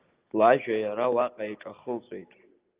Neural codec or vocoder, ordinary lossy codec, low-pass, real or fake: none; Opus, 32 kbps; 3.6 kHz; real